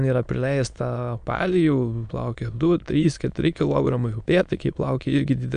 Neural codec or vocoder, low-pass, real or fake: autoencoder, 22.05 kHz, a latent of 192 numbers a frame, VITS, trained on many speakers; 9.9 kHz; fake